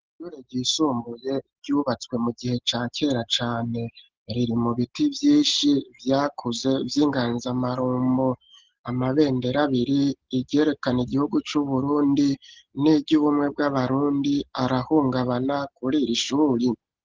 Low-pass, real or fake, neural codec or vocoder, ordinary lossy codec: 7.2 kHz; real; none; Opus, 16 kbps